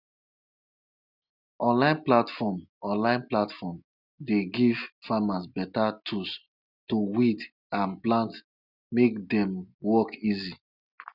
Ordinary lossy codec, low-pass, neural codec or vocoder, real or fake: none; 5.4 kHz; none; real